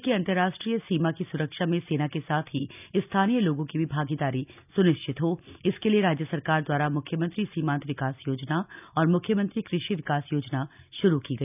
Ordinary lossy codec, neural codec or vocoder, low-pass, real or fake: none; none; 3.6 kHz; real